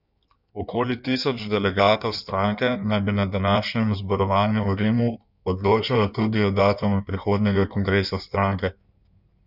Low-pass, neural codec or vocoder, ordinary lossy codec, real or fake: 5.4 kHz; codec, 16 kHz in and 24 kHz out, 1.1 kbps, FireRedTTS-2 codec; none; fake